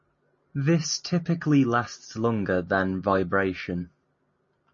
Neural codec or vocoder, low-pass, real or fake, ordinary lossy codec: none; 7.2 kHz; real; MP3, 32 kbps